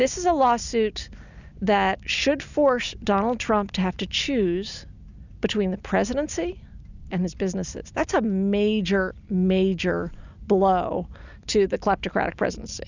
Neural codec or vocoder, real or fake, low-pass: none; real; 7.2 kHz